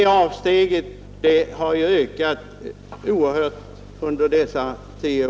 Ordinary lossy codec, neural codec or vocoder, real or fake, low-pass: none; none; real; none